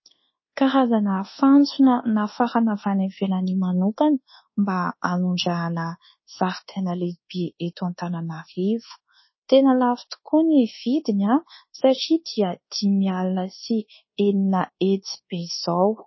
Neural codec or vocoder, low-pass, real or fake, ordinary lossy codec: codec, 24 kHz, 1.2 kbps, DualCodec; 7.2 kHz; fake; MP3, 24 kbps